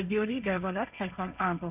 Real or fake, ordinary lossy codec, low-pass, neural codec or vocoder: fake; none; 3.6 kHz; codec, 16 kHz, 1.1 kbps, Voila-Tokenizer